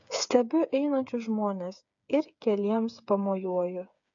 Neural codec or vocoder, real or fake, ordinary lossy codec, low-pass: codec, 16 kHz, 8 kbps, FreqCodec, smaller model; fake; AAC, 64 kbps; 7.2 kHz